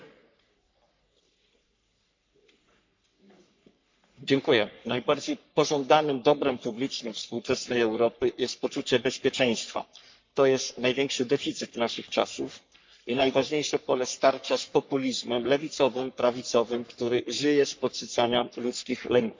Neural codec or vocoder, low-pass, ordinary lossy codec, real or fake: codec, 44.1 kHz, 3.4 kbps, Pupu-Codec; 7.2 kHz; MP3, 64 kbps; fake